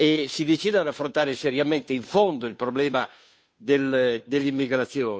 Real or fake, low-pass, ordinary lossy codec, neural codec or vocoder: fake; none; none; codec, 16 kHz, 2 kbps, FunCodec, trained on Chinese and English, 25 frames a second